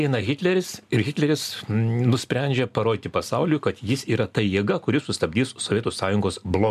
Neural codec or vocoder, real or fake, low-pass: none; real; 14.4 kHz